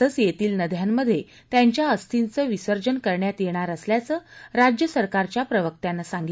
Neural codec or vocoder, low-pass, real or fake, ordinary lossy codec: none; none; real; none